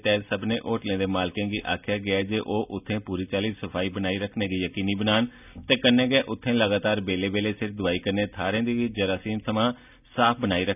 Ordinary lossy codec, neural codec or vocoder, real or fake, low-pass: none; none; real; 3.6 kHz